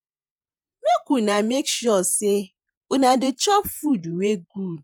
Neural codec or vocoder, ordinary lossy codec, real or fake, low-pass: vocoder, 48 kHz, 128 mel bands, Vocos; none; fake; none